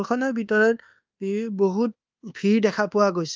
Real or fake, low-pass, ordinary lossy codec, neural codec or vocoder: fake; 7.2 kHz; Opus, 24 kbps; codec, 24 kHz, 1.2 kbps, DualCodec